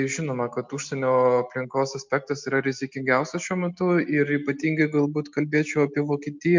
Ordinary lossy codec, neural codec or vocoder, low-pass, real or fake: MP3, 64 kbps; none; 7.2 kHz; real